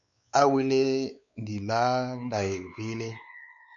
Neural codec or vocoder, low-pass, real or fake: codec, 16 kHz, 4 kbps, X-Codec, WavLM features, trained on Multilingual LibriSpeech; 7.2 kHz; fake